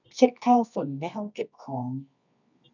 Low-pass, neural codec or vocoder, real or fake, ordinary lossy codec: 7.2 kHz; codec, 24 kHz, 0.9 kbps, WavTokenizer, medium music audio release; fake; none